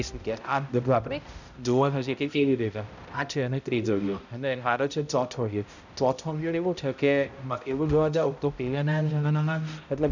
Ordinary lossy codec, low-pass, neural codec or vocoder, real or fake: none; 7.2 kHz; codec, 16 kHz, 0.5 kbps, X-Codec, HuBERT features, trained on balanced general audio; fake